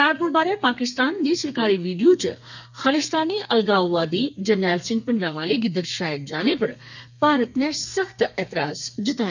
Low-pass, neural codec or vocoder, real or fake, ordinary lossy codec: 7.2 kHz; codec, 32 kHz, 1.9 kbps, SNAC; fake; none